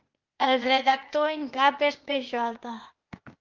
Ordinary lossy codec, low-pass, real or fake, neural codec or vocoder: Opus, 24 kbps; 7.2 kHz; fake; codec, 16 kHz, 0.8 kbps, ZipCodec